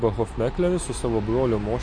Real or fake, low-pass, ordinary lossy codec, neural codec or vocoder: real; 9.9 kHz; MP3, 48 kbps; none